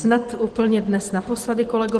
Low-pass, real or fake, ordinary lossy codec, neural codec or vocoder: 10.8 kHz; real; Opus, 16 kbps; none